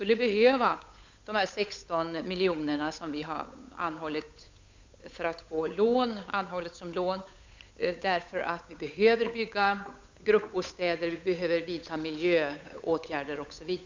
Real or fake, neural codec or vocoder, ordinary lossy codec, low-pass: fake; codec, 16 kHz, 8 kbps, FunCodec, trained on Chinese and English, 25 frames a second; MP3, 64 kbps; 7.2 kHz